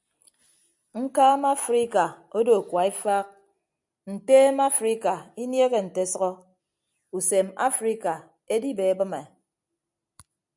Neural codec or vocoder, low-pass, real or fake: none; 10.8 kHz; real